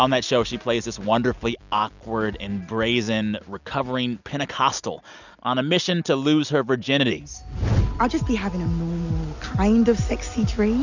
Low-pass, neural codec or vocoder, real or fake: 7.2 kHz; none; real